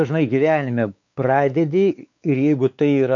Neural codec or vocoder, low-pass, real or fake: codec, 16 kHz, 4 kbps, X-Codec, WavLM features, trained on Multilingual LibriSpeech; 7.2 kHz; fake